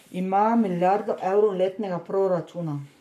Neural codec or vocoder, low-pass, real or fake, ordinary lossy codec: codec, 44.1 kHz, 7.8 kbps, Pupu-Codec; 14.4 kHz; fake; none